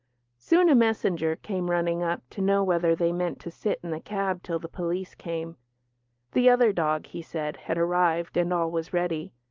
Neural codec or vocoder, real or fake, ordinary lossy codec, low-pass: none; real; Opus, 32 kbps; 7.2 kHz